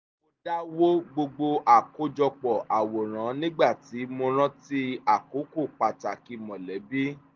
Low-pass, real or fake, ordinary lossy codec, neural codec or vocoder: 7.2 kHz; real; Opus, 32 kbps; none